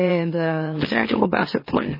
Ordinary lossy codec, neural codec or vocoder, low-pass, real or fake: MP3, 24 kbps; autoencoder, 44.1 kHz, a latent of 192 numbers a frame, MeloTTS; 5.4 kHz; fake